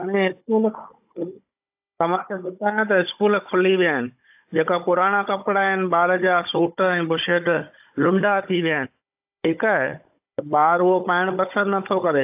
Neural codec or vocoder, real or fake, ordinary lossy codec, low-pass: codec, 16 kHz, 16 kbps, FunCodec, trained on Chinese and English, 50 frames a second; fake; AAC, 32 kbps; 3.6 kHz